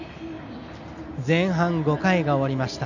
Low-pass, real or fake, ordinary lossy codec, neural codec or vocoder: 7.2 kHz; real; none; none